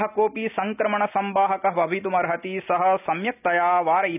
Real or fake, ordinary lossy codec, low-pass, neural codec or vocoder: real; none; 3.6 kHz; none